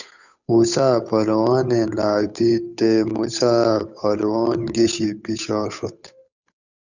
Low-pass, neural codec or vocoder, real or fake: 7.2 kHz; codec, 16 kHz, 8 kbps, FunCodec, trained on Chinese and English, 25 frames a second; fake